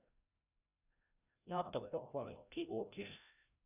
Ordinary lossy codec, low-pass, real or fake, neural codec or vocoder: none; 3.6 kHz; fake; codec, 16 kHz, 0.5 kbps, FreqCodec, larger model